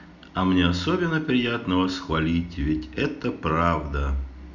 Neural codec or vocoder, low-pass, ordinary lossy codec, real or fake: none; 7.2 kHz; none; real